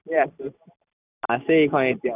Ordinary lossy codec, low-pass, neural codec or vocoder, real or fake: none; 3.6 kHz; none; real